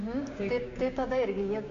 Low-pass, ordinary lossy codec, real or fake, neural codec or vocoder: 7.2 kHz; MP3, 64 kbps; fake; codec, 16 kHz, 6 kbps, DAC